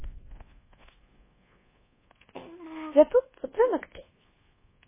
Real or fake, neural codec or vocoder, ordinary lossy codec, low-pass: fake; codec, 24 kHz, 1.2 kbps, DualCodec; MP3, 16 kbps; 3.6 kHz